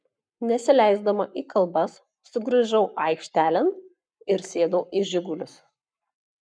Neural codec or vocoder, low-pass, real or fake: codec, 44.1 kHz, 7.8 kbps, Pupu-Codec; 9.9 kHz; fake